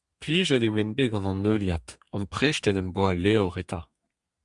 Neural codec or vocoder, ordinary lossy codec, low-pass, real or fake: codec, 32 kHz, 1.9 kbps, SNAC; Opus, 64 kbps; 10.8 kHz; fake